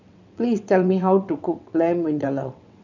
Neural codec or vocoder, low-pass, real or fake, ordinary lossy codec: vocoder, 22.05 kHz, 80 mel bands, WaveNeXt; 7.2 kHz; fake; none